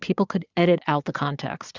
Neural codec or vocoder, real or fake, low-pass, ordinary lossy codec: vocoder, 22.05 kHz, 80 mel bands, Vocos; fake; 7.2 kHz; Opus, 64 kbps